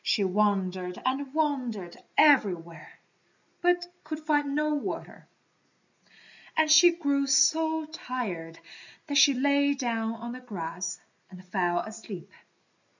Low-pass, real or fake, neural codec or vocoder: 7.2 kHz; real; none